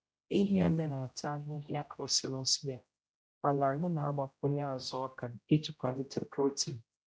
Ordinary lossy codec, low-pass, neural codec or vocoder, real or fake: none; none; codec, 16 kHz, 0.5 kbps, X-Codec, HuBERT features, trained on general audio; fake